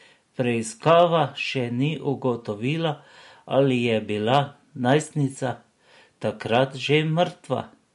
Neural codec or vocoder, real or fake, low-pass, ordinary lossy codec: none; real; 14.4 kHz; MP3, 48 kbps